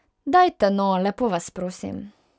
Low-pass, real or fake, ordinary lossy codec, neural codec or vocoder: none; real; none; none